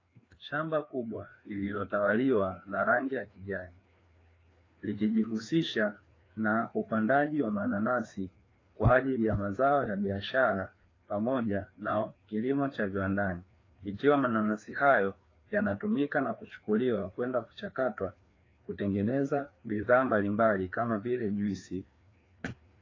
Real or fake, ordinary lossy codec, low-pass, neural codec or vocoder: fake; AAC, 32 kbps; 7.2 kHz; codec, 16 kHz, 2 kbps, FreqCodec, larger model